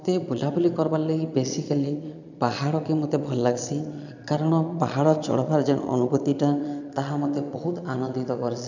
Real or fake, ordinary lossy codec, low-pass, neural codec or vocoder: fake; none; 7.2 kHz; vocoder, 22.05 kHz, 80 mel bands, Vocos